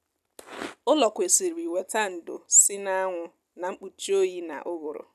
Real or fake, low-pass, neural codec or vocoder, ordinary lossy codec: real; 14.4 kHz; none; none